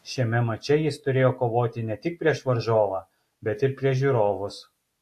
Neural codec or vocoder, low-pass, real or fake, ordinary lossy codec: none; 14.4 kHz; real; AAC, 64 kbps